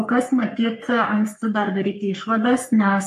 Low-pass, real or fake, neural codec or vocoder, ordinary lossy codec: 14.4 kHz; fake; codec, 44.1 kHz, 3.4 kbps, Pupu-Codec; AAC, 96 kbps